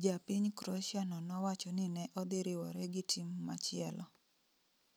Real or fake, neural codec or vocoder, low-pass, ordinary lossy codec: real; none; none; none